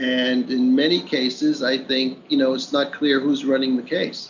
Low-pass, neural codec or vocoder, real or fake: 7.2 kHz; none; real